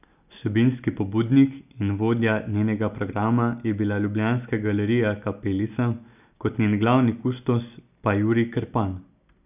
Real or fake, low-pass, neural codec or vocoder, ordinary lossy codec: real; 3.6 kHz; none; none